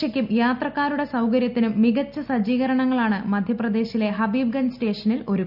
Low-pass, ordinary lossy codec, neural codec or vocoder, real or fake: 5.4 kHz; none; none; real